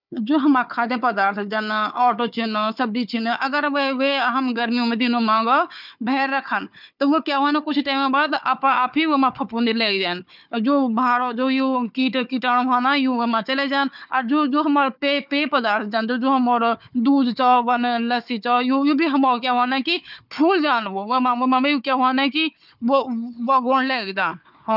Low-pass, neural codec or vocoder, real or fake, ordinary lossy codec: 5.4 kHz; codec, 16 kHz, 4 kbps, FunCodec, trained on Chinese and English, 50 frames a second; fake; none